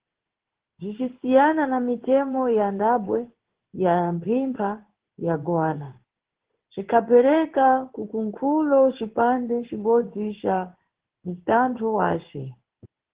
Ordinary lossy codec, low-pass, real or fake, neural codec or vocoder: Opus, 16 kbps; 3.6 kHz; fake; codec, 16 kHz in and 24 kHz out, 1 kbps, XY-Tokenizer